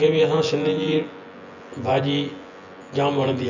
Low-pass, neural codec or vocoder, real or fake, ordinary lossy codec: 7.2 kHz; vocoder, 24 kHz, 100 mel bands, Vocos; fake; none